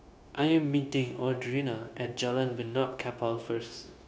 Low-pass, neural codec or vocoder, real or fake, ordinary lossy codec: none; codec, 16 kHz, 0.9 kbps, LongCat-Audio-Codec; fake; none